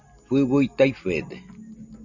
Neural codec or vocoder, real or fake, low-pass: none; real; 7.2 kHz